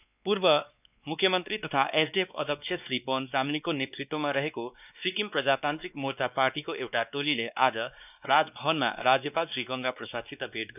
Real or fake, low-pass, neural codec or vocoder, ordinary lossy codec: fake; 3.6 kHz; codec, 16 kHz, 2 kbps, X-Codec, WavLM features, trained on Multilingual LibriSpeech; none